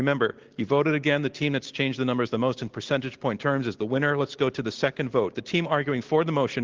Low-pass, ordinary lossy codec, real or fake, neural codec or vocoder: 7.2 kHz; Opus, 16 kbps; real; none